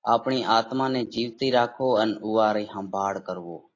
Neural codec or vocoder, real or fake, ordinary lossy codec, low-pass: none; real; AAC, 32 kbps; 7.2 kHz